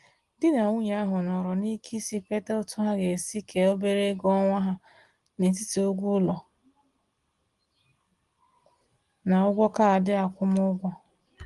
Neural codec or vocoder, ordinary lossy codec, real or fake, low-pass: none; Opus, 24 kbps; real; 10.8 kHz